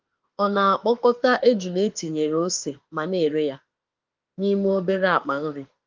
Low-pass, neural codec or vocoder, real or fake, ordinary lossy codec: 7.2 kHz; autoencoder, 48 kHz, 32 numbers a frame, DAC-VAE, trained on Japanese speech; fake; Opus, 32 kbps